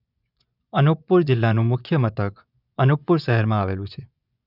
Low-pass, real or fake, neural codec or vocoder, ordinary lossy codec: 5.4 kHz; fake; vocoder, 24 kHz, 100 mel bands, Vocos; none